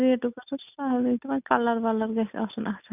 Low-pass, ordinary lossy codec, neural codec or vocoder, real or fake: 3.6 kHz; none; none; real